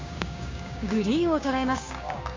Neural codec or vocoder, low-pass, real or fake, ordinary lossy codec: codec, 16 kHz, 6 kbps, DAC; 7.2 kHz; fake; MP3, 64 kbps